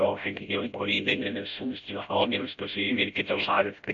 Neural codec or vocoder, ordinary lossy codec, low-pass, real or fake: codec, 16 kHz, 0.5 kbps, FreqCodec, smaller model; AAC, 64 kbps; 7.2 kHz; fake